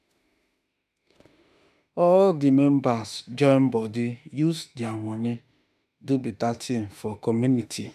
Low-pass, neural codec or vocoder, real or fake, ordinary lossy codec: 14.4 kHz; autoencoder, 48 kHz, 32 numbers a frame, DAC-VAE, trained on Japanese speech; fake; none